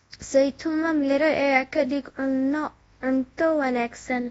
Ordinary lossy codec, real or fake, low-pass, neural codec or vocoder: AAC, 24 kbps; fake; 10.8 kHz; codec, 24 kHz, 0.9 kbps, WavTokenizer, large speech release